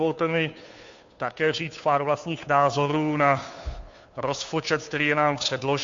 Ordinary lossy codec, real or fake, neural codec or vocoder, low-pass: MP3, 48 kbps; fake; codec, 16 kHz, 2 kbps, FunCodec, trained on Chinese and English, 25 frames a second; 7.2 kHz